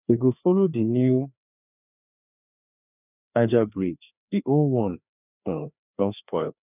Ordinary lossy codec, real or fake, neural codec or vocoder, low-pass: none; fake; codec, 16 kHz, 2 kbps, FreqCodec, larger model; 3.6 kHz